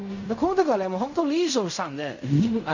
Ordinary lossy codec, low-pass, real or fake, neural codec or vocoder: none; 7.2 kHz; fake; codec, 16 kHz in and 24 kHz out, 0.4 kbps, LongCat-Audio-Codec, fine tuned four codebook decoder